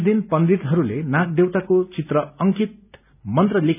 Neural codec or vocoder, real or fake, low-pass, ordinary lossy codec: none; real; 3.6 kHz; none